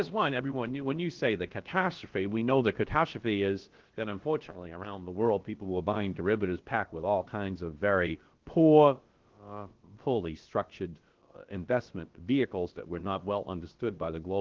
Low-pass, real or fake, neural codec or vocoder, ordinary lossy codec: 7.2 kHz; fake; codec, 16 kHz, about 1 kbps, DyCAST, with the encoder's durations; Opus, 16 kbps